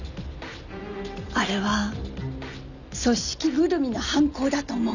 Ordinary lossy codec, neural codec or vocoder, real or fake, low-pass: none; none; real; 7.2 kHz